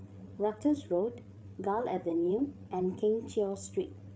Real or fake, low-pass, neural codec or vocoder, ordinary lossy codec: fake; none; codec, 16 kHz, 8 kbps, FreqCodec, larger model; none